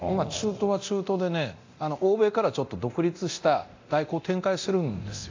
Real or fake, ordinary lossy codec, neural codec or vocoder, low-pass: fake; AAC, 48 kbps; codec, 24 kHz, 0.9 kbps, DualCodec; 7.2 kHz